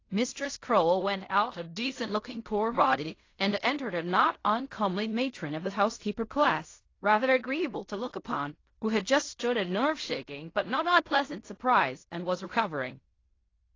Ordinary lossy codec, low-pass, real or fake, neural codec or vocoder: AAC, 32 kbps; 7.2 kHz; fake; codec, 16 kHz in and 24 kHz out, 0.4 kbps, LongCat-Audio-Codec, fine tuned four codebook decoder